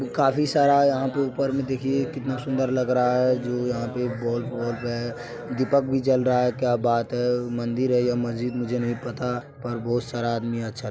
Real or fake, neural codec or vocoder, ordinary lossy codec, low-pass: real; none; none; none